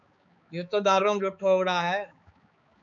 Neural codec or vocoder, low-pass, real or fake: codec, 16 kHz, 4 kbps, X-Codec, HuBERT features, trained on balanced general audio; 7.2 kHz; fake